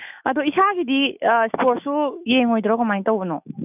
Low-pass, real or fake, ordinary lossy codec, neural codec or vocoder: 3.6 kHz; fake; none; autoencoder, 48 kHz, 128 numbers a frame, DAC-VAE, trained on Japanese speech